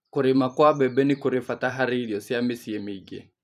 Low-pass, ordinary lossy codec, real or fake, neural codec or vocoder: 14.4 kHz; none; fake; vocoder, 44.1 kHz, 128 mel bands every 512 samples, BigVGAN v2